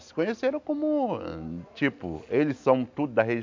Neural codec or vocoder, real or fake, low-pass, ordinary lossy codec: none; real; 7.2 kHz; MP3, 64 kbps